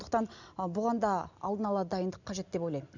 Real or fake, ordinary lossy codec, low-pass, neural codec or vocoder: real; none; 7.2 kHz; none